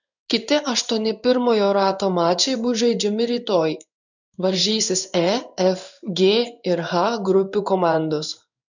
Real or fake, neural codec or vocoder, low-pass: fake; codec, 16 kHz in and 24 kHz out, 1 kbps, XY-Tokenizer; 7.2 kHz